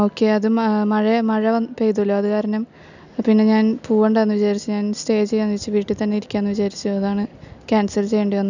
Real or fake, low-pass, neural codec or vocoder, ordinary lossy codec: real; 7.2 kHz; none; none